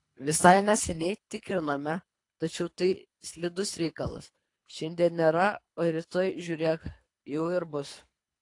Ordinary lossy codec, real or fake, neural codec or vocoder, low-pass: AAC, 48 kbps; fake; codec, 24 kHz, 3 kbps, HILCodec; 10.8 kHz